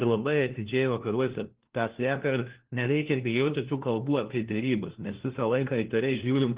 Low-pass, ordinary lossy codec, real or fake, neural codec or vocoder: 3.6 kHz; Opus, 16 kbps; fake; codec, 16 kHz, 1 kbps, FunCodec, trained on LibriTTS, 50 frames a second